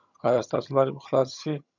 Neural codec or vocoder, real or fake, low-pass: vocoder, 22.05 kHz, 80 mel bands, HiFi-GAN; fake; 7.2 kHz